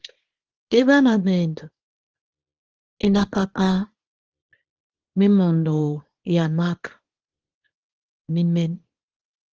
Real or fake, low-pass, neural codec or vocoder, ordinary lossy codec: fake; 7.2 kHz; codec, 24 kHz, 0.9 kbps, WavTokenizer, small release; Opus, 16 kbps